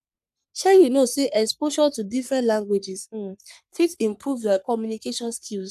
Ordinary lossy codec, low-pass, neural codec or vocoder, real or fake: none; 14.4 kHz; codec, 44.1 kHz, 3.4 kbps, Pupu-Codec; fake